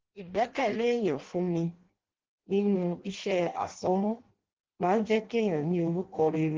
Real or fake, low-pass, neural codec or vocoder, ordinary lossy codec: fake; 7.2 kHz; codec, 16 kHz in and 24 kHz out, 0.6 kbps, FireRedTTS-2 codec; Opus, 16 kbps